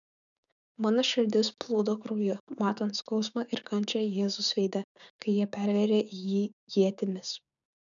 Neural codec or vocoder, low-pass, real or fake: codec, 16 kHz, 6 kbps, DAC; 7.2 kHz; fake